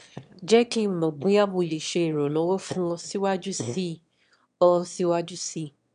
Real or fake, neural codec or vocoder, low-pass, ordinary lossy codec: fake; autoencoder, 22.05 kHz, a latent of 192 numbers a frame, VITS, trained on one speaker; 9.9 kHz; none